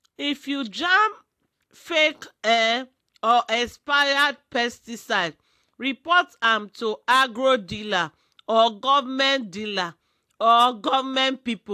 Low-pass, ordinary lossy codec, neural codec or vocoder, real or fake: 14.4 kHz; AAC, 64 kbps; none; real